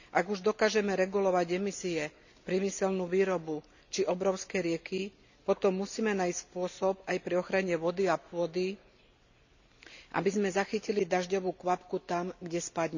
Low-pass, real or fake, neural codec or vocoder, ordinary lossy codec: 7.2 kHz; real; none; none